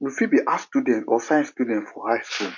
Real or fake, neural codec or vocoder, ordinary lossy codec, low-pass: real; none; MP3, 48 kbps; 7.2 kHz